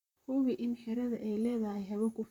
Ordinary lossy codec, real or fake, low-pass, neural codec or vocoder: none; fake; 19.8 kHz; vocoder, 44.1 kHz, 128 mel bands, Pupu-Vocoder